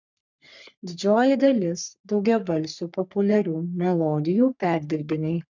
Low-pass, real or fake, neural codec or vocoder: 7.2 kHz; fake; codec, 44.1 kHz, 3.4 kbps, Pupu-Codec